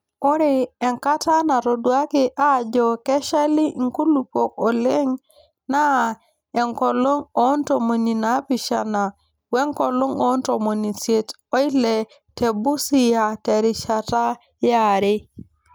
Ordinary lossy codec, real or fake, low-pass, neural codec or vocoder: none; real; none; none